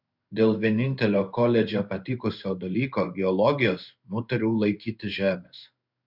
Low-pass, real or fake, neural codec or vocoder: 5.4 kHz; fake; codec, 16 kHz in and 24 kHz out, 1 kbps, XY-Tokenizer